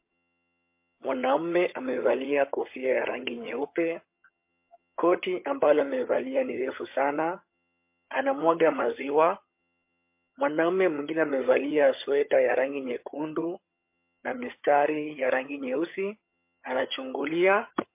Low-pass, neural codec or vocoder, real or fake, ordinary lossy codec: 3.6 kHz; vocoder, 22.05 kHz, 80 mel bands, HiFi-GAN; fake; MP3, 24 kbps